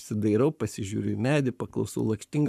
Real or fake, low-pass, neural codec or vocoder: fake; 14.4 kHz; codec, 44.1 kHz, 7.8 kbps, Pupu-Codec